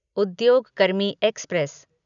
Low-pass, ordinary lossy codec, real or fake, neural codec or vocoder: 7.2 kHz; none; real; none